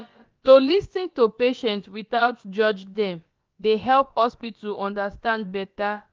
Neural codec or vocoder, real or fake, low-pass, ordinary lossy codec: codec, 16 kHz, about 1 kbps, DyCAST, with the encoder's durations; fake; 7.2 kHz; Opus, 24 kbps